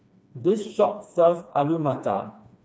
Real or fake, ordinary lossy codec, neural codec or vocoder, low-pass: fake; none; codec, 16 kHz, 2 kbps, FreqCodec, smaller model; none